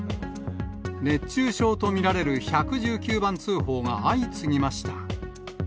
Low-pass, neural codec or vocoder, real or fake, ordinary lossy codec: none; none; real; none